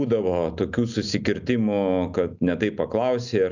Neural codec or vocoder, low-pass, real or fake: none; 7.2 kHz; real